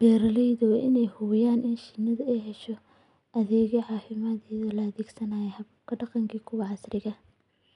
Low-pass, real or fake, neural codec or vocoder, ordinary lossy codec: 10.8 kHz; real; none; none